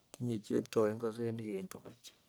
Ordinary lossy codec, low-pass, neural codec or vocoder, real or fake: none; none; codec, 44.1 kHz, 1.7 kbps, Pupu-Codec; fake